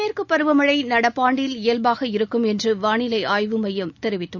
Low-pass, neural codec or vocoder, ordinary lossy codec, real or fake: 7.2 kHz; none; none; real